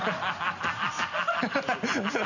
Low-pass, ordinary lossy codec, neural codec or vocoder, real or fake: 7.2 kHz; none; none; real